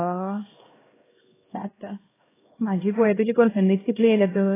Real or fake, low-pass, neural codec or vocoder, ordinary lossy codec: fake; 3.6 kHz; codec, 16 kHz, 1 kbps, X-Codec, HuBERT features, trained on LibriSpeech; AAC, 16 kbps